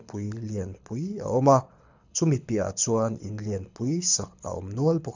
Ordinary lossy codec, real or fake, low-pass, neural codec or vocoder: none; fake; 7.2 kHz; codec, 24 kHz, 6 kbps, HILCodec